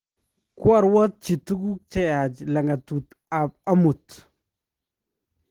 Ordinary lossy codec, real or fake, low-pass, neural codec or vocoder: Opus, 16 kbps; real; 19.8 kHz; none